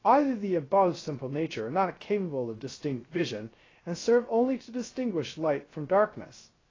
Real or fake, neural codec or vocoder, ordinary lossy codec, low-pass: fake; codec, 16 kHz, 0.3 kbps, FocalCodec; AAC, 32 kbps; 7.2 kHz